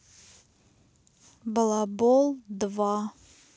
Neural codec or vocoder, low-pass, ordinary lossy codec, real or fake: none; none; none; real